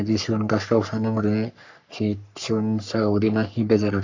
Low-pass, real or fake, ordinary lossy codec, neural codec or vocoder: 7.2 kHz; fake; AAC, 48 kbps; codec, 44.1 kHz, 3.4 kbps, Pupu-Codec